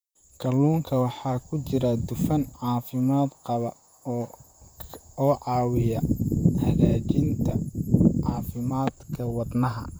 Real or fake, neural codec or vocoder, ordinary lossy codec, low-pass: fake; vocoder, 44.1 kHz, 128 mel bands, Pupu-Vocoder; none; none